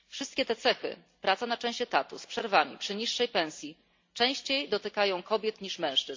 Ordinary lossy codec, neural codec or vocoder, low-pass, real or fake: MP3, 48 kbps; none; 7.2 kHz; real